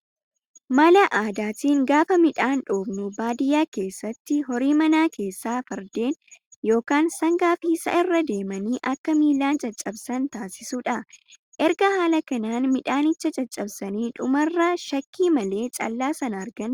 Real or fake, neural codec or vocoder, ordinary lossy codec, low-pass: real; none; Opus, 64 kbps; 19.8 kHz